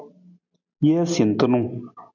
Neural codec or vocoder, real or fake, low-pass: none; real; 7.2 kHz